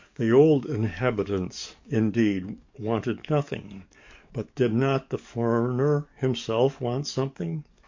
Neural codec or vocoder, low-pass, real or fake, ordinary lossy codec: codec, 44.1 kHz, 7.8 kbps, DAC; 7.2 kHz; fake; MP3, 48 kbps